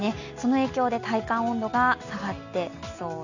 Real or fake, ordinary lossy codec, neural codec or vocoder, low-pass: real; none; none; 7.2 kHz